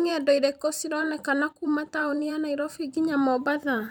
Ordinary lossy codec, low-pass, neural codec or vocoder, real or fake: none; 19.8 kHz; vocoder, 44.1 kHz, 128 mel bands every 512 samples, BigVGAN v2; fake